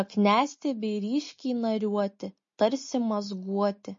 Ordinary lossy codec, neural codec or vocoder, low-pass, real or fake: MP3, 32 kbps; none; 7.2 kHz; real